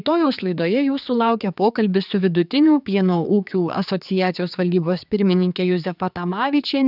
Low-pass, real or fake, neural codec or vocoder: 5.4 kHz; fake; codec, 16 kHz, 4 kbps, X-Codec, HuBERT features, trained on general audio